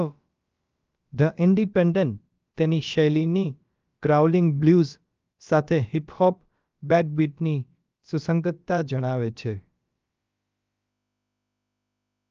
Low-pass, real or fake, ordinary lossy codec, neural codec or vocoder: 7.2 kHz; fake; Opus, 24 kbps; codec, 16 kHz, about 1 kbps, DyCAST, with the encoder's durations